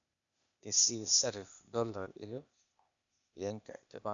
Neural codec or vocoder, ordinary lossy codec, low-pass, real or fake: codec, 16 kHz, 0.8 kbps, ZipCodec; none; 7.2 kHz; fake